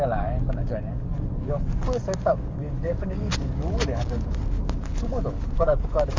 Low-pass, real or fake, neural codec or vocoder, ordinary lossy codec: 7.2 kHz; fake; codec, 44.1 kHz, 7.8 kbps, DAC; Opus, 32 kbps